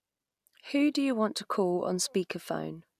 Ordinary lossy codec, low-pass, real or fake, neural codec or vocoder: none; 14.4 kHz; real; none